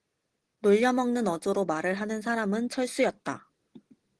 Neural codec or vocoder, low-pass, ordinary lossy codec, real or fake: none; 10.8 kHz; Opus, 16 kbps; real